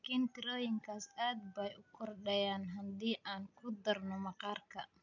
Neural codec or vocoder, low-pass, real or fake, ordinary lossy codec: none; 7.2 kHz; real; none